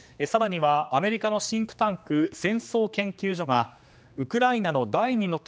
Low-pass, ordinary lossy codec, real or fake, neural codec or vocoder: none; none; fake; codec, 16 kHz, 2 kbps, X-Codec, HuBERT features, trained on general audio